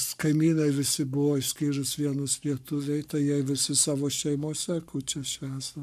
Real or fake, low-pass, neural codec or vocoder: fake; 14.4 kHz; codec, 44.1 kHz, 7.8 kbps, Pupu-Codec